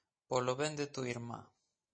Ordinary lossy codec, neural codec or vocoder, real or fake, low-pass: MP3, 32 kbps; none; real; 9.9 kHz